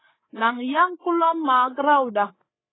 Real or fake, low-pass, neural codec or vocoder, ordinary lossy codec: fake; 7.2 kHz; codec, 16 kHz, 8 kbps, FreqCodec, larger model; AAC, 16 kbps